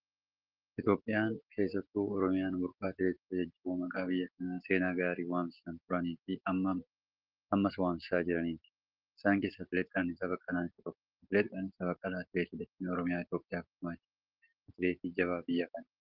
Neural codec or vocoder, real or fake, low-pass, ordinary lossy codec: none; real; 5.4 kHz; Opus, 16 kbps